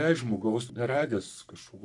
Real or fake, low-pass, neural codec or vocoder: fake; 10.8 kHz; codec, 44.1 kHz, 3.4 kbps, Pupu-Codec